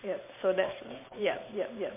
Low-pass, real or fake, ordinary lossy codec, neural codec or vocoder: 3.6 kHz; fake; MP3, 24 kbps; vocoder, 44.1 kHz, 128 mel bands every 512 samples, BigVGAN v2